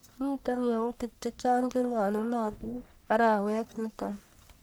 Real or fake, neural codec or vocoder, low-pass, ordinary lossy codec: fake; codec, 44.1 kHz, 1.7 kbps, Pupu-Codec; none; none